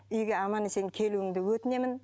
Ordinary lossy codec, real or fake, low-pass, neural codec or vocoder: none; real; none; none